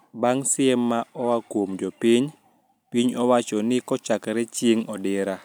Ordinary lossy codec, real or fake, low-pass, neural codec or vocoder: none; real; none; none